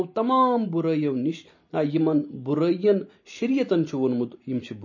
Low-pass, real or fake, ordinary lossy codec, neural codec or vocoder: 7.2 kHz; real; MP3, 32 kbps; none